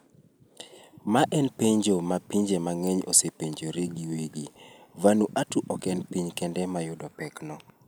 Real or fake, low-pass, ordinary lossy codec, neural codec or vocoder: real; none; none; none